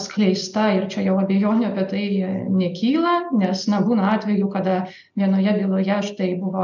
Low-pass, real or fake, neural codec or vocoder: 7.2 kHz; real; none